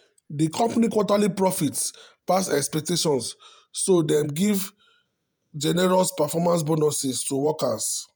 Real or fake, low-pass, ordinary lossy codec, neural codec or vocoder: fake; none; none; vocoder, 48 kHz, 128 mel bands, Vocos